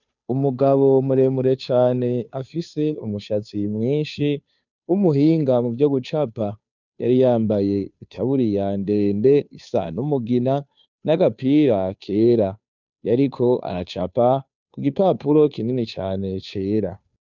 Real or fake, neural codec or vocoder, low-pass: fake; codec, 16 kHz, 2 kbps, FunCodec, trained on Chinese and English, 25 frames a second; 7.2 kHz